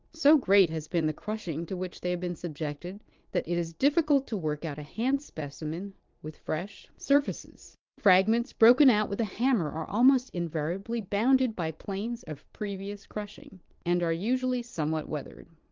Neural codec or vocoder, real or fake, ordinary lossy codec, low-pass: vocoder, 22.05 kHz, 80 mel bands, Vocos; fake; Opus, 32 kbps; 7.2 kHz